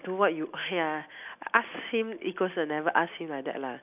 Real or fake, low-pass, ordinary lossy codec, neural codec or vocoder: real; 3.6 kHz; none; none